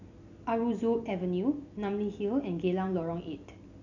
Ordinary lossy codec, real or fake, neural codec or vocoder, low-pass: none; real; none; 7.2 kHz